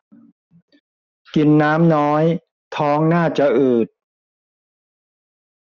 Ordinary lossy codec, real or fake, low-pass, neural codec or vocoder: none; real; 7.2 kHz; none